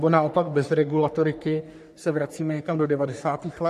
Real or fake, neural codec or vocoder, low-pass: fake; codec, 44.1 kHz, 3.4 kbps, Pupu-Codec; 14.4 kHz